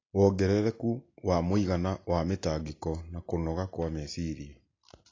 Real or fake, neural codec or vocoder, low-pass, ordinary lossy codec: real; none; 7.2 kHz; AAC, 32 kbps